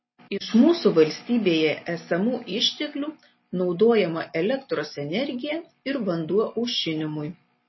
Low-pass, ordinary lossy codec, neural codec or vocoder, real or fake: 7.2 kHz; MP3, 24 kbps; none; real